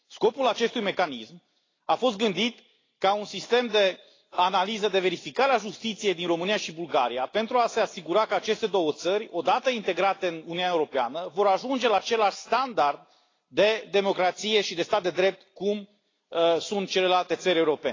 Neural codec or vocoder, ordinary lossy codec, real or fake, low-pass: none; AAC, 32 kbps; real; 7.2 kHz